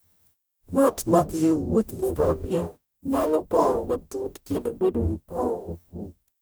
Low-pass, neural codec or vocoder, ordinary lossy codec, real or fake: none; codec, 44.1 kHz, 0.9 kbps, DAC; none; fake